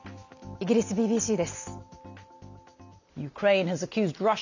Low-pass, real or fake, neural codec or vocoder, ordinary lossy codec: 7.2 kHz; real; none; none